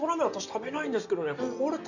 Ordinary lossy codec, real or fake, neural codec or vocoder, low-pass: none; real; none; 7.2 kHz